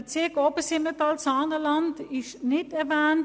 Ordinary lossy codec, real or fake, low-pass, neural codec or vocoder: none; real; none; none